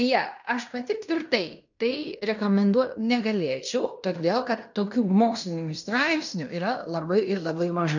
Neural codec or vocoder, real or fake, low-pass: codec, 16 kHz in and 24 kHz out, 0.9 kbps, LongCat-Audio-Codec, fine tuned four codebook decoder; fake; 7.2 kHz